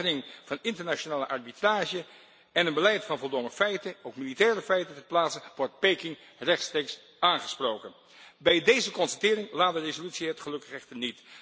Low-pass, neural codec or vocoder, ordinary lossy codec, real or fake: none; none; none; real